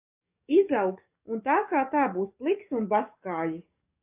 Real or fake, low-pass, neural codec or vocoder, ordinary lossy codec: fake; 3.6 kHz; codec, 44.1 kHz, 7.8 kbps, DAC; AAC, 32 kbps